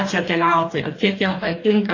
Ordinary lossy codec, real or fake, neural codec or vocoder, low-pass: AAC, 32 kbps; fake; codec, 44.1 kHz, 2.6 kbps, DAC; 7.2 kHz